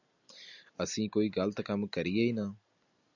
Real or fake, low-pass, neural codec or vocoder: real; 7.2 kHz; none